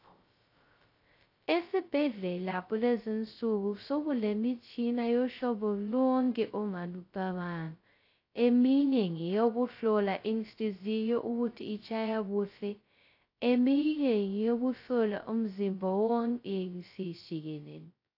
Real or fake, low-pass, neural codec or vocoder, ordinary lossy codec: fake; 5.4 kHz; codec, 16 kHz, 0.2 kbps, FocalCodec; AAC, 32 kbps